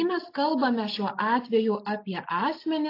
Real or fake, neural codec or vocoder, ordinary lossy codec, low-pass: real; none; AAC, 32 kbps; 5.4 kHz